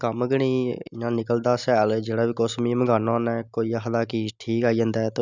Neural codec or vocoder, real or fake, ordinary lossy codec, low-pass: none; real; none; 7.2 kHz